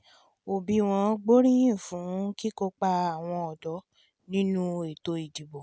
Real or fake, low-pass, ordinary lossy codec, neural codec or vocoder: real; none; none; none